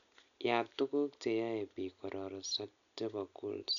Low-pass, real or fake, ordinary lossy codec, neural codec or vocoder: 7.2 kHz; real; none; none